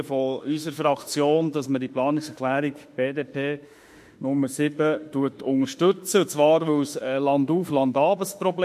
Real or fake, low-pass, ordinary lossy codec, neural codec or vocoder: fake; 14.4 kHz; MP3, 64 kbps; autoencoder, 48 kHz, 32 numbers a frame, DAC-VAE, trained on Japanese speech